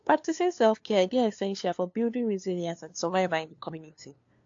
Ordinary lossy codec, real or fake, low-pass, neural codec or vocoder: AAC, 48 kbps; fake; 7.2 kHz; codec, 16 kHz, 2 kbps, FunCodec, trained on LibriTTS, 25 frames a second